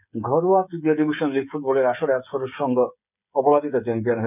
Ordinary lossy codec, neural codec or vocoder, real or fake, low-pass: none; codec, 16 kHz, 8 kbps, FreqCodec, smaller model; fake; 3.6 kHz